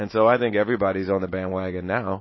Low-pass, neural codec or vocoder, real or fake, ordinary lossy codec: 7.2 kHz; none; real; MP3, 24 kbps